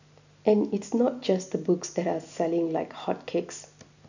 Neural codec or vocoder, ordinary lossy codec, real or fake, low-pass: none; none; real; 7.2 kHz